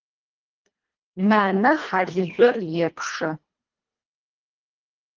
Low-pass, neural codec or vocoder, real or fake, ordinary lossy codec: 7.2 kHz; codec, 24 kHz, 1.5 kbps, HILCodec; fake; Opus, 32 kbps